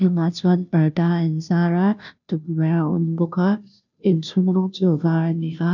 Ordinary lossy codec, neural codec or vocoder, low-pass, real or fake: none; codec, 16 kHz, 0.5 kbps, FunCodec, trained on Chinese and English, 25 frames a second; 7.2 kHz; fake